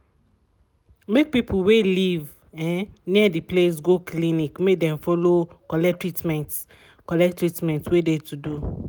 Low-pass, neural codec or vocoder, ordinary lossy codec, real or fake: none; none; none; real